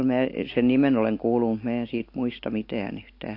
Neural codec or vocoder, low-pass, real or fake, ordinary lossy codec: none; 5.4 kHz; real; AAC, 32 kbps